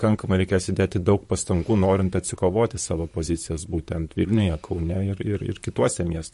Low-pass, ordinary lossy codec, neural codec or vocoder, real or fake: 14.4 kHz; MP3, 48 kbps; vocoder, 44.1 kHz, 128 mel bands, Pupu-Vocoder; fake